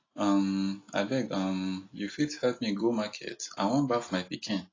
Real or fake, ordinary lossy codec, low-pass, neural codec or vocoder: real; AAC, 32 kbps; 7.2 kHz; none